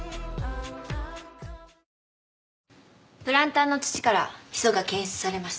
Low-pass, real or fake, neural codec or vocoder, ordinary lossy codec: none; real; none; none